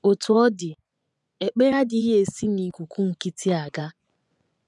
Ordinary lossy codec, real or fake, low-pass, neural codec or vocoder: none; fake; 10.8 kHz; vocoder, 24 kHz, 100 mel bands, Vocos